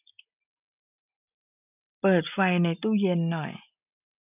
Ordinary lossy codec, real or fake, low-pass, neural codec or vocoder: none; real; 3.6 kHz; none